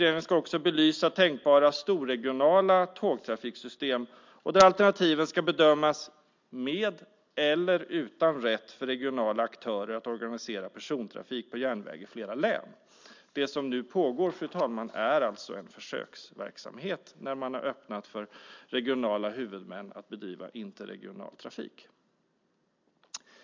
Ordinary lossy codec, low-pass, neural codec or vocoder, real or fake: MP3, 64 kbps; 7.2 kHz; none; real